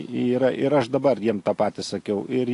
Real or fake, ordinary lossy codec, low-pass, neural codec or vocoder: real; AAC, 48 kbps; 10.8 kHz; none